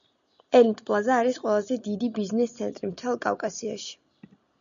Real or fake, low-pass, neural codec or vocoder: real; 7.2 kHz; none